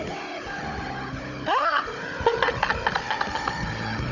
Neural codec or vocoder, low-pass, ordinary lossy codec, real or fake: codec, 16 kHz, 16 kbps, FunCodec, trained on Chinese and English, 50 frames a second; 7.2 kHz; none; fake